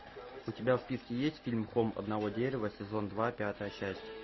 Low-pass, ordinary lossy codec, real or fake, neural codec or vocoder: 7.2 kHz; MP3, 24 kbps; real; none